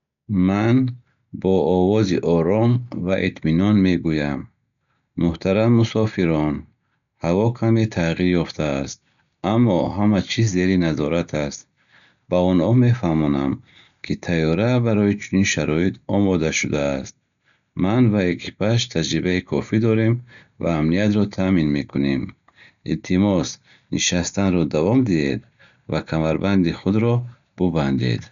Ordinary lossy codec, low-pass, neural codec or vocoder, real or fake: none; 7.2 kHz; none; real